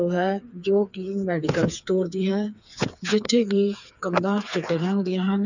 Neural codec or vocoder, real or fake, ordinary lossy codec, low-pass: codec, 16 kHz, 4 kbps, FreqCodec, smaller model; fake; none; 7.2 kHz